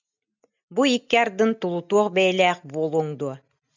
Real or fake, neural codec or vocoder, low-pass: real; none; 7.2 kHz